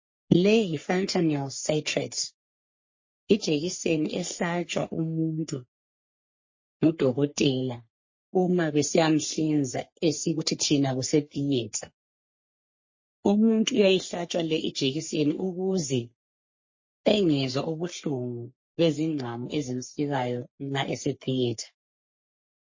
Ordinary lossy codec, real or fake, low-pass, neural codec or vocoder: MP3, 32 kbps; fake; 7.2 kHz; codec, 44.1 kHz, 3.4 kbps, Pupu-Codec